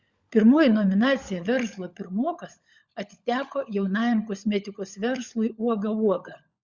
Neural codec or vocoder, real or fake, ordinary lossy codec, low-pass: codec, 16 kHz, 16 kbps, FunCodec, trained on LibriTTS, 50 frames a second; fake; Opus, 64 kbps; 7.2 kHz